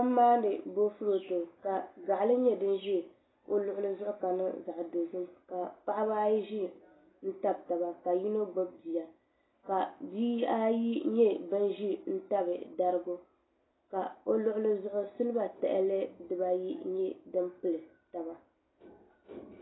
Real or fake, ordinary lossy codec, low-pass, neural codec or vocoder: real; AAC, 16 kbps; 7.2 kHz; none